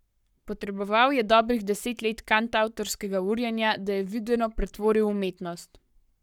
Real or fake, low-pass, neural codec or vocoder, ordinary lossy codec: fake; 19.8 kHz; codec, 44.1 kHz, 7.8 kbps, Pupu-Codec; none